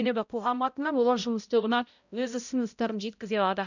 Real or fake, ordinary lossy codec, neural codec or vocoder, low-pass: fake; none; codec, 16 kHz, 0.5 kbps, X-Codec, HuBERT features, trained on balanced general audio; 7.2 kHz